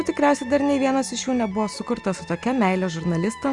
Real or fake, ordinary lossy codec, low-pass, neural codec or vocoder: real; Opus, 64 kbps; 10.8 kHz; none